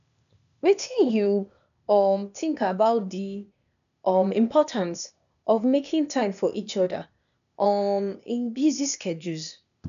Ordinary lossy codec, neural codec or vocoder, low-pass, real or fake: none; codec, 16 kHz, 0.8 kbps, ZipCodec; 7.2 kHz; fake